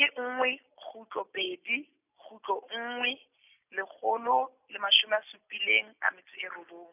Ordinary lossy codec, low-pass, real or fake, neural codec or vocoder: none; 3.6 kHz; real; none